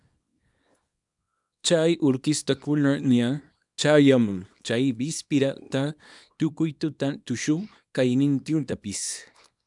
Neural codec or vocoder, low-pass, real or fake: codec, 24 kHz, 0.9 kbps, WavTokenizer, small release; 10.8 kHz; fake